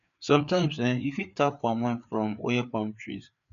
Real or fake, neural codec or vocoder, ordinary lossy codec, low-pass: fake; codec, 16 kHz, 4 kbps, FreqCodec, larger model; none; 7.2 kHz